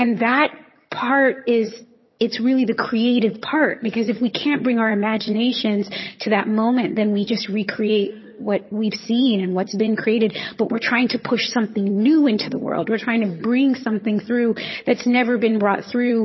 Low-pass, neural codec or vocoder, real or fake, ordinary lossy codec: 7.2 kHz; vocoder, 22.05 kHz, 80 mel bands, HiFi-GAN; fake; MP3, 24 kbps